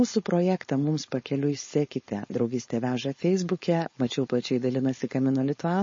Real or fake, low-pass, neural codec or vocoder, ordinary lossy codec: fake; 7.2 kHz; codec, 16 kHz, 4.8 kbps, FACodec; MP3, 32 kbps